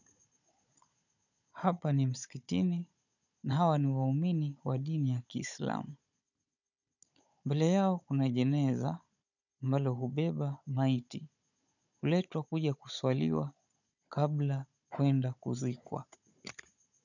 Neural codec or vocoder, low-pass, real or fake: codec, 16 kHz, 16 kbps, FunCodec, trained on Chinese and English, 50 frames a second; 7.2 kHz; fake